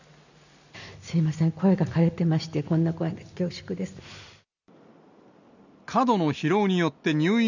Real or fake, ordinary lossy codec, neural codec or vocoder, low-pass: real; none; none; 7.2 kHz